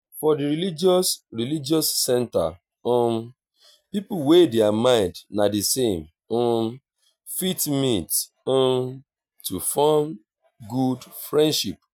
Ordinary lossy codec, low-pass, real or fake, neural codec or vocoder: none; none; real; none